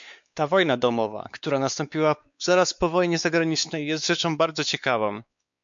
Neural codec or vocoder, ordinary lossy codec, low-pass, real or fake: codec, 16 kHz, 4 kbps, X-Codec, WavLM features, trained on Multilingual LibriSpeech; MP3, 64 kbps; 7.2 kHz; fake